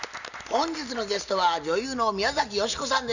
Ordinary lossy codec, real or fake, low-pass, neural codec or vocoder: none; real; 7.2 kHz; none